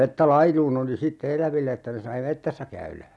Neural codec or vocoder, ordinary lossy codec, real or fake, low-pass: none; none; real; none